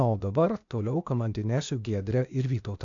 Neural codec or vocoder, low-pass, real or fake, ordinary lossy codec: codec, 16 kHz, 0.8 kbps, ZipCodec; 7.2 kHz; fake; MP3, 64 kbps